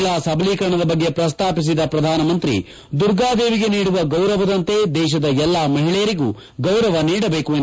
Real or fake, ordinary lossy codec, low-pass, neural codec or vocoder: real; none; none; none